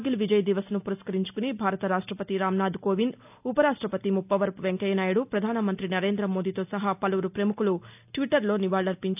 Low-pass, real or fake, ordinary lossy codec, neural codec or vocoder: 3.6 kHz; real; none; none